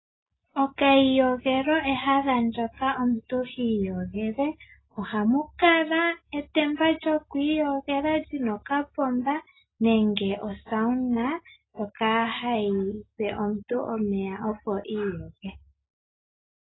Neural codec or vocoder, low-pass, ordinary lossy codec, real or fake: none; 7.2 kHz; AAC, 16 kbps; real